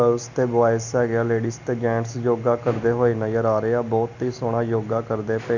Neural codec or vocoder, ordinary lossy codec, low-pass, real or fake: none; none; 7.2 kHz; real